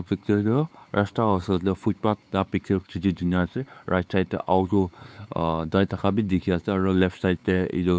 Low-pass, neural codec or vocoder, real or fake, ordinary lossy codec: none; codec, 16 kHz, 4 kbps, X-Codec, WavLM features, trained on Multilingual LibriSpeech; fake; none